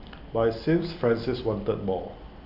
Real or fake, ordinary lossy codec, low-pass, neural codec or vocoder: real; none; 5.4 kHz; none